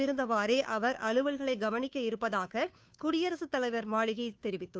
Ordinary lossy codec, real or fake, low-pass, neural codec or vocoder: none; fake; none; codec, 16 kHz, 2 kbps, FunCodec, trained on Chinese and English, 25 frames a second